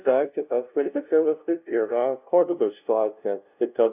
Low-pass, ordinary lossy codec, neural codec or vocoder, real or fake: 3.6 kHz; AAC, 32 kbps; codec, 16 kHz, 0.5 kbps, FunCodec, trained on LibriTTS, 25 frames a second; fake